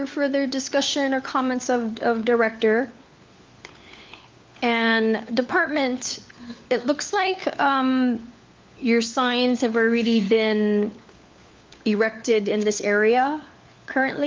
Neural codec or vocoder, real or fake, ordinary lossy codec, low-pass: codec, 16 kHz, 4 kbps, FunCodec, trained on LibriTTS, 50 frames a second; fake; Opus, 24 kbps; 7.2 kHz